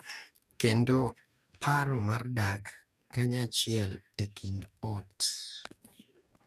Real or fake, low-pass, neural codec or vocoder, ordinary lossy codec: fake; 14.4 kHz; codec, 44.1 kHz, 2.6 kbps, DAC; none